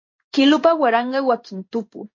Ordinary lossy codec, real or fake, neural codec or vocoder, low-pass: MP3, 32 kbps; fake; codec, 16 kHz in and 24 kHz out, 1 kbps, XY-Tokenizer; 7.2 kHz